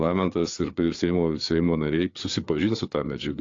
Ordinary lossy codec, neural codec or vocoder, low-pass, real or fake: AAC, 48 kbps; codec, 16 kHz, 4 kbps, FreqCodec, larger model; 7.2 kHz; fake